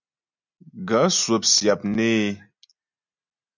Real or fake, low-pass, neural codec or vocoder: real; 7.2 kHz; none